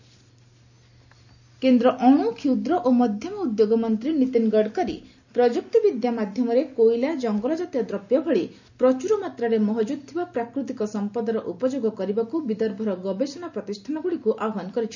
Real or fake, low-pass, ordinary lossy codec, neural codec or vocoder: real; 7.2 kHz; none; none